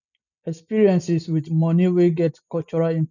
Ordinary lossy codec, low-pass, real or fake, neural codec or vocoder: none; 7.2 kHz; real; none